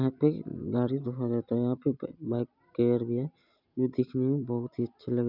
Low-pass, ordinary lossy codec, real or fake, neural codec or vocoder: 5.4 kHz; none; real; none